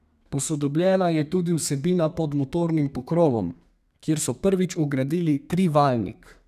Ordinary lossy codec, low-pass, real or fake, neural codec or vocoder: none; 14.4 kHz; fake; codec, 32 kHz, 1.9 kbps, SNAC